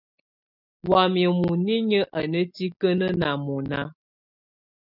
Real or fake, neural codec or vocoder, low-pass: real; none; 5.4 kHz